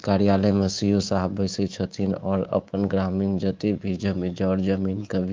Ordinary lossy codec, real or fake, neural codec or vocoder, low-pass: Opus, 24 kbps; fake; codec, 24 kHz, 3.1 kbps, DualCodec; 7.2 kHz